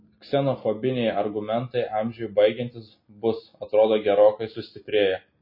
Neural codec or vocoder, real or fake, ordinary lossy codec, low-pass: none; real; MP3, 24 kbps; 5.4 kHz